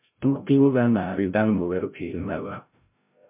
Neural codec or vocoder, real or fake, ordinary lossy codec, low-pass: codec, 16 kHz, 0.5 kbps, FreqCodec, larger model; fake; MP3, 32 kbps; 3.6 kHz